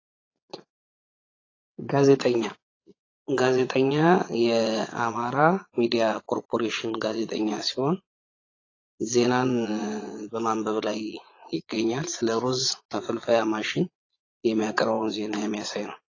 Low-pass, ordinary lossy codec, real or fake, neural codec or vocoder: 7.2 kHz; AAC, 32 kbps; fake; vocoder, 22.05 kHz, 80 mel bands, Vocos